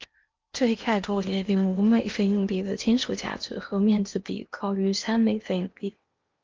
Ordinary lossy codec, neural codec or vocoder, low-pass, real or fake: Opus, 24 kbps; codec, 16 kHz in and 24 kHz out, 0.8 kbps, FocalCodec, streaming, 65536 codes; 7.2 kHz; fake